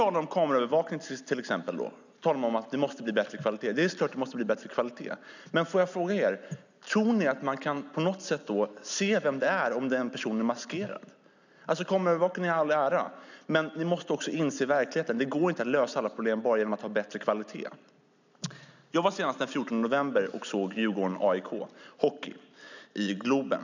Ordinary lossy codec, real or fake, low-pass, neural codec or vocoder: none; real; 7.2 kHz; none